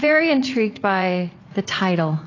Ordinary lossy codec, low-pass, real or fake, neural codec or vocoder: AAC, 32 kbps; 7.2 kHz; fake; vocoder, 44.1 kHz, 80 mel bands, Vocos